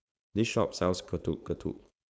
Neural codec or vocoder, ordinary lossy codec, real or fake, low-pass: codec, 16 kHz, 4.8 kbps, FACodec; none; fake; none